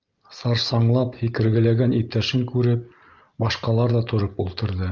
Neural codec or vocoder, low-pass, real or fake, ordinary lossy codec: none; 7.2 kHz; real; Opus, 32 kbps